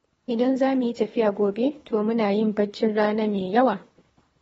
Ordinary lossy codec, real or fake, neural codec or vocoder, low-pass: AAC, 24 kbps; fake; codec, 24 kHz, 3 kbps, HILCodec; 10.8 kHz